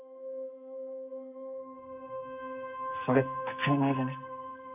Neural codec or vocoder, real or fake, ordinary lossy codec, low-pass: codec, 44.1 kHz, 2.6 kbps, SNAC; fake; none; 3.6 kHz